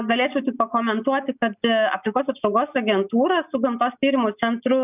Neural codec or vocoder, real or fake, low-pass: none; real; 3.6 kHz